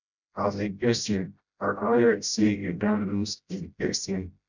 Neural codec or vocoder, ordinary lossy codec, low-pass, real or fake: codec, 16 kHz, 0.5 kbps, FreqCodec, smaller model; none; 7.2 kHz; fake